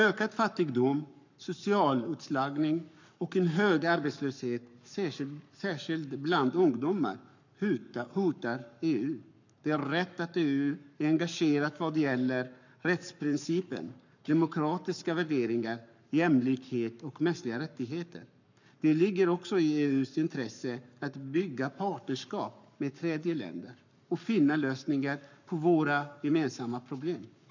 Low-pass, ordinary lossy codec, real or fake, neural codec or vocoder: 7.2 kHz; none; fake; codec, 44.1 kHz, 7.8 kbps, Pupu-Codec